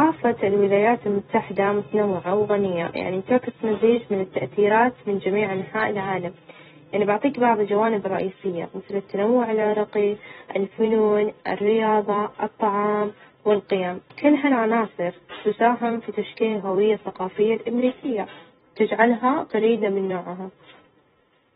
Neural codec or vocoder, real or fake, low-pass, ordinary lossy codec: vocoder, 44.1 kHz, 128 mel bands every 256 samples, BigVGAN v2; fake; 19.8 kHz; AAC, 16 kbps